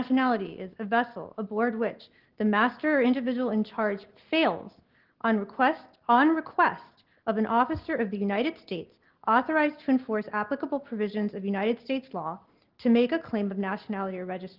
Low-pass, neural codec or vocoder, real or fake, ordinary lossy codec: 5.4 kHz; none; real; Opus, 16 kbps